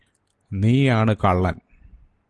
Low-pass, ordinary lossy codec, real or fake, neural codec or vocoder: 10.8 kHz; Opus, 24 kbps; real; none